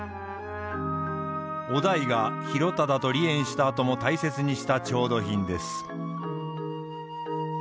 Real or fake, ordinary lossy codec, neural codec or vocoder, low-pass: real; none; none; none